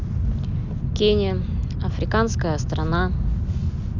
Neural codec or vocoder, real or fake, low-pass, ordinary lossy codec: none; real; 7.2 kHz; none